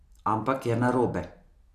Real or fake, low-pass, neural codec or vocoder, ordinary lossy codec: real; 14.4 kHz; none; none